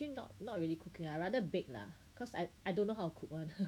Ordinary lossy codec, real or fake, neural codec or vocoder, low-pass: none; fake; autoencoder, 48 kHz, 128 numbers a frame, DAC-VAE, trained on Japanese speech; 19.8 kHz